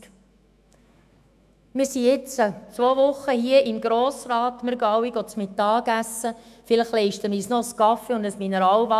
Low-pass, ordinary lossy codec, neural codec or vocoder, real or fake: 14.4 kHz; none; autoencoder, 48 kHz, 128 numbers a frame, DAC-VAE, trained on Japanese speech; fake